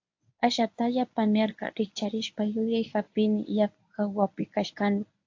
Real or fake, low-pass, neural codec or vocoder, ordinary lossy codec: fake; 7.2 kHz; codec, 24 kHz, 0.9 kbps, WavTokenizer, medium speech release version 1; AAC, 48 kbps